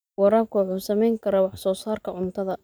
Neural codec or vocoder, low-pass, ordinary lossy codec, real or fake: none; none; none; real